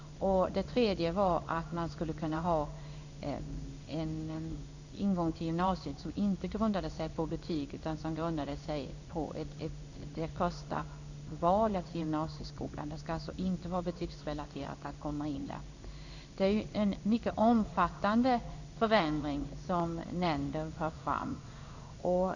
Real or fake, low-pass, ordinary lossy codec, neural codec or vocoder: fake; 7.2 kHz; Opus, 64 kbps; codec, 16 kHz in and 24 kHz out, 1 kbps, XY-Tokenizer